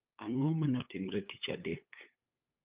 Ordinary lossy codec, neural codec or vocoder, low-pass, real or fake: Opus, 32 kbps; codec, 16 kHz, 8 kbps, FunCodec, trained on LibriTTS, 25 frames a second; 3.6 kHz; fake